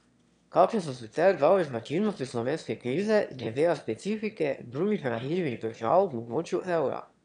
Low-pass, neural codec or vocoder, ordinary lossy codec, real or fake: 9.9 kHz; autoencoder, 22.05 kHz, a latent of 192 numbers a frame, VITS, trained on one speaker; none; fake